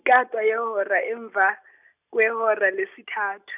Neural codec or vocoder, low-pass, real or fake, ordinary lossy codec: none; 3.6 kHz; real; none